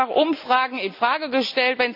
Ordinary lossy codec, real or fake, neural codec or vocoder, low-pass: none; real; none; 5.4 kHz